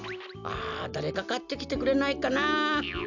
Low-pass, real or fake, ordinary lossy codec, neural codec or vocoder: 7.2 kHz; real; none; none